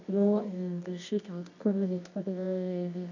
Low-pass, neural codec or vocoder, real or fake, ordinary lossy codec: 7.2 kHz; codec, 24 kHz, 0.9 kbps, WavTokenizer, medium music audio release; fake; none